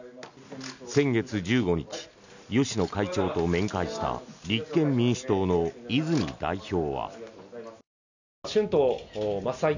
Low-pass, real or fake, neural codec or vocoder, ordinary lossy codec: 7.2 kHz; real; none; none